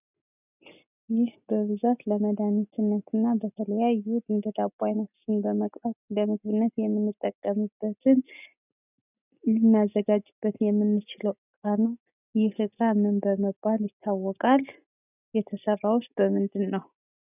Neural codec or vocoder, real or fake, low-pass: none; real; 3.6 kHz